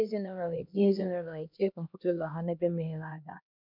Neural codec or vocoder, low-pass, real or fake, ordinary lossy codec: codec, 16 kHz, 1 kbps, X-Codec, HuBERT features, trained on LibriSpeech; 5.4 kHz; fake; MP3, 48 kbps